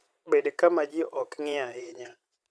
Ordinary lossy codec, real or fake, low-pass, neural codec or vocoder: none; fake; none; vocoder, 22.05 kHz, 80 mel bands, Vocos